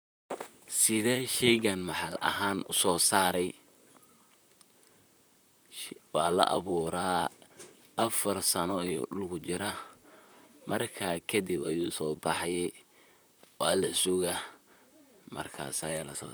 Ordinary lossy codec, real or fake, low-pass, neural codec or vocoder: none; fake; none; vocoder, 44.1 kHz, 128 mel bands, Pupu-Vocoder